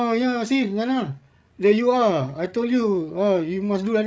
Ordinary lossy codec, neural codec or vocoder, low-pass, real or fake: none; codec, 16 kHz, 16 kbps, FreqCodec, larger model; none; fake